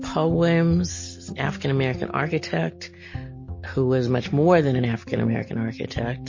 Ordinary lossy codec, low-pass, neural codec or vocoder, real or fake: MP3, 32 kbps; 7.2 kHz; none; real